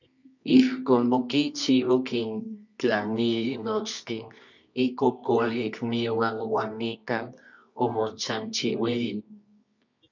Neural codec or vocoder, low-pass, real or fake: codec, 24 kHz, 0.9 kbps, WavTokenizer, medium music audio release; 7.2 kHz; fake